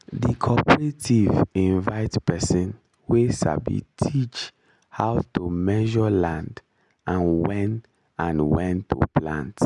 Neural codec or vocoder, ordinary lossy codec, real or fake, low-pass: none; none; real; 10.8 kHz